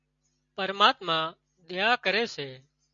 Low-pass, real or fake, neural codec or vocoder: 7.2 kHz; real; none